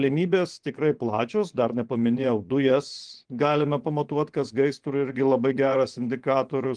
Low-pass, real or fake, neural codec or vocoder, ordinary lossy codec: 9.9 kHz; fake; vocoder, 24 kHz, 100 mel bands, Vocos; Opus, 24 kbps